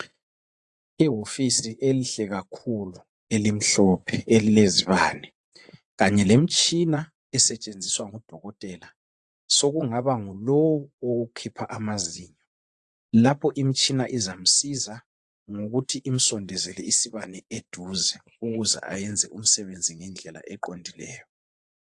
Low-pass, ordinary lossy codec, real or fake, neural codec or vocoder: 10.8 kHz; AAC, 64 kbps; fake; vocoder, 24 kHz, 100 mel bands, Vocos